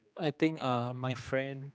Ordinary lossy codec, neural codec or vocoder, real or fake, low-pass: none; codec, 16 kHz, 2 kbps, X-Codec, HuBERT features, trained on general audio; fake; none